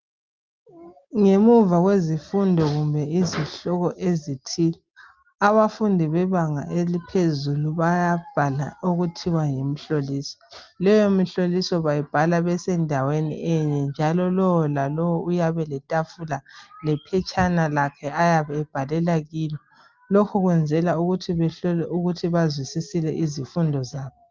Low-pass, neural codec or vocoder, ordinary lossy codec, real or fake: 7.2 kHz; none; Opus, 32 kbps; real